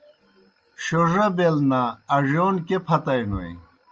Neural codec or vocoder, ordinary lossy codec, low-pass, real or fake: none; Opus, 24 kbps; 7.2 kHz; real